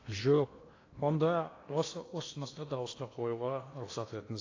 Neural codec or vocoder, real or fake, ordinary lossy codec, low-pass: codec, 16 kHz in and 24 kHz out, 0.8 kbps, FocalCodec, streaming, 65536 codes; fake; AAC, 32 kbps; 7.2 kHz